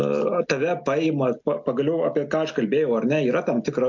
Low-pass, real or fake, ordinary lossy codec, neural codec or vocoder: 7.2 kHz; real; MP3, 64 kbps; none